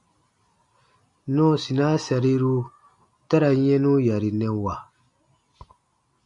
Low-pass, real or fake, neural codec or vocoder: 10.8 kHz; real; none